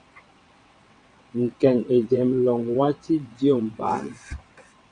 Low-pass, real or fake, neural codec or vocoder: 9.9 kHz; fake; vocoder, 22.05 kHz, 80 mel bands, WaveNeXt